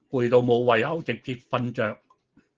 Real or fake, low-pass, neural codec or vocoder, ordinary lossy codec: real; 7.2 kHz; none; Opus, 16 kbps